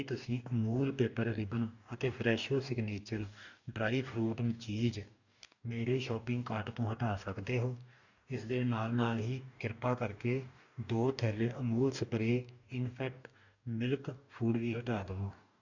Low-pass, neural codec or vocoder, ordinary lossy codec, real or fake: 7.2 kHz; codec, 44.1 kHz, 2.6 kbps, DAC; none; fake